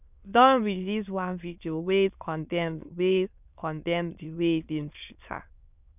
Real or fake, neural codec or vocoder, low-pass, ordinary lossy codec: fake; autoencoder, 22.05 kHz, a latent of 192 numbers a frame, VITS, trained on many speakers; 3.6 kHz; none